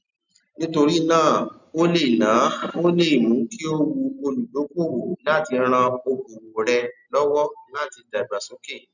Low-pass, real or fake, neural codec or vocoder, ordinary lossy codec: 7.2 kHz; real; none; none